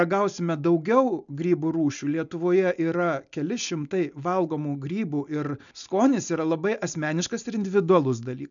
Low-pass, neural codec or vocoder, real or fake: 7.2 kHz; none; real